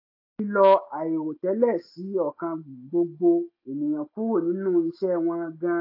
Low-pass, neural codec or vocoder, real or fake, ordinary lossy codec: 5.4 kHz; none; real; AAC, 32 kbps